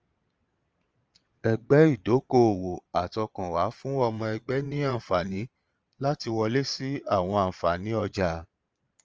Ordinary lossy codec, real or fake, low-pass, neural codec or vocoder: Opus, 24 kbps; fake; 7.2 kHz; vocoder, 22.05 kHz, 80 mel bands, Vocos